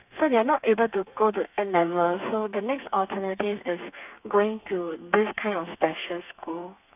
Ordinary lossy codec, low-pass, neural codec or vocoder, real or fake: none; 3.6 kHz; codec, 32 kHz, 1.9 kbps, SNAC; fake